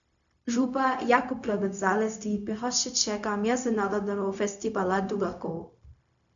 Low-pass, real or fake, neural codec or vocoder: 7.2 kHz; fake; codec, 16 kHz, 0.4 kbps, LongCat-Audio-Codec